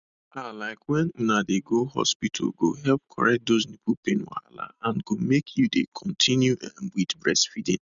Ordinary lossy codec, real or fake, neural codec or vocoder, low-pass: none; real; none; 7.2 kHz